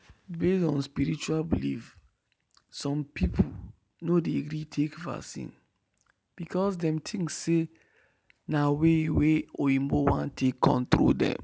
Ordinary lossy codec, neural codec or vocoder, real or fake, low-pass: none; none; real; none